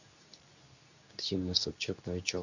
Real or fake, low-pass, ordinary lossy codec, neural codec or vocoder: fake; 7.2 kHz; none; codec, 24 kHz, 0.9 kbps, WavTokenizer, medium speech release version 2